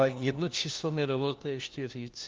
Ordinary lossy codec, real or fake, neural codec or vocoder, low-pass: Opus, 32 kbps; fake; codec, 16 kHz, 1 kbps, FunCodec, trained on LibriTTS, 50 frames a second; 7.2 kHz